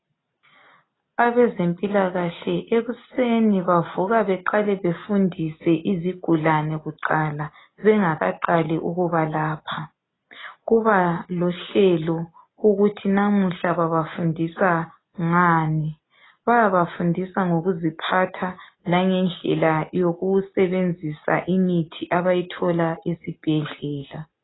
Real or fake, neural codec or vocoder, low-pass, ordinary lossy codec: real; none; 7.2 kHz; AAC, 16 kbps